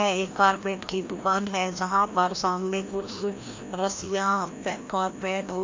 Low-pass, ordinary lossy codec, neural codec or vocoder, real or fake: 7.2 kHz; MP3, 64 kbps; codec, 16 kHz, 1 kbps, FreqCodec, larger model; fake